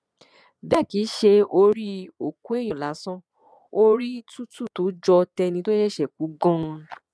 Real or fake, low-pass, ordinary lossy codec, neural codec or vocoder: fake; none; none; vocoder, 22.05 kHz, 80 mel bands, Vocos